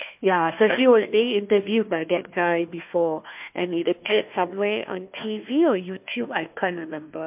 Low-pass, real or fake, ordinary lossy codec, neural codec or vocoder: 3.6 kHz; fake; MP3, 32 kbps; codec, 16 kHz, 1 kbps, FunCodec, trained on Chinese and English, 50 frames a second